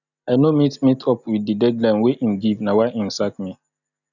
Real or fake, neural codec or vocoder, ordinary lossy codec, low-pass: real; none; none; 7.2 kHz